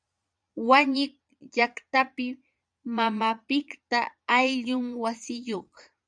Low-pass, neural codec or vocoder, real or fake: 9.9 kHz; vocoder, 22.05 kHz, 80 mel bands, Vocos; fake